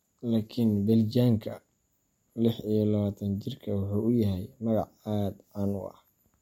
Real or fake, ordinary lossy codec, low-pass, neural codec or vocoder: real; MP3, 64 kbps; 19.8 kHz; none